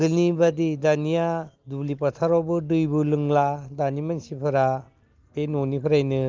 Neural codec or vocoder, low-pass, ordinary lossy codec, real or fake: none; 7.2 kHz; Opus, 32 kbps; real